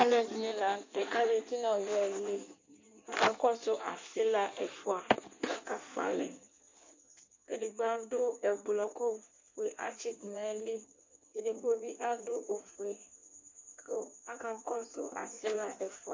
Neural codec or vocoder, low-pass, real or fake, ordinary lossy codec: codec, 16 kHz in and 24 kHz out, 1.1 kbps, FireRedTTS-2 codec; 7.2 kHz; fake; MP3, 64 kbps